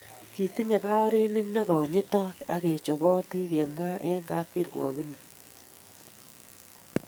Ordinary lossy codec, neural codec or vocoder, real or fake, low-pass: none; codec, 44.1 kHz, 2.6 kbps, SNAC; fake; none